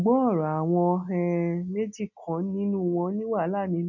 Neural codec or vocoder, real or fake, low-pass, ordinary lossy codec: none; real; 7.2 kHz; none